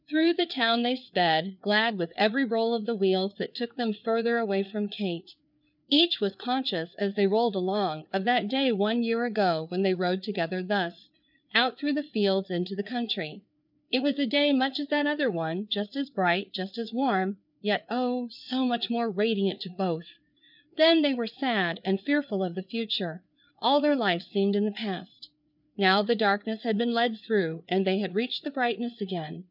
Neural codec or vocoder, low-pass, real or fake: codec, 16 kHz, 4 kbps, FreqCodec, larger model; 5.4 kHz; fake